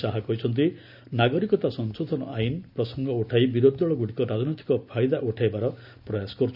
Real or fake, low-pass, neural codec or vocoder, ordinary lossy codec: real; 5.4 kHz; none; none